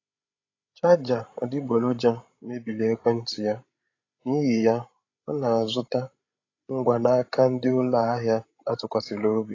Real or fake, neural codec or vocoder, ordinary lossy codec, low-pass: fake; codec, 16 kHz, 16 kbps, FreqCodec, larger model; AAC, 32 kbps; 7.2 kHz